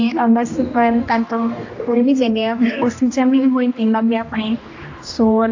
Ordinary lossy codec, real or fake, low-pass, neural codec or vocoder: AAC, 48 kbps; fake; 7.2 kHz; codec, 16 kHz, 1 kbps, X-Codec, HuBERT features, trained on general audio